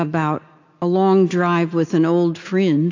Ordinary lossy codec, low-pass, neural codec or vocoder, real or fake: AAC, 48 kbps; 7.2 kHz; none; real